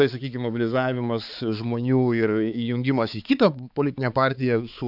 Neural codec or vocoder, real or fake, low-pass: codec, 16 kHz, 4 kbps, X-Codec, HuBERT features, trained on balanced general audio; fake; 5.4 kHz